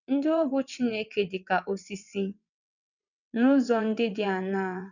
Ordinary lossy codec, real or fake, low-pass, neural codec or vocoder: none; fake; 7.2 kHz; vocoder, 22.05 kHz, 80 mel bands, WaveNeXt